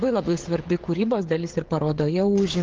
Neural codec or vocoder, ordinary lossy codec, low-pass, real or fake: codec, 16 kHz, 16 kbps, FreqCodec, smaller model; Opus, 16 kbps; 7.2 kHz; fake